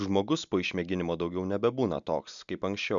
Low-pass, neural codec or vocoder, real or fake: 7.2 kHz; none; real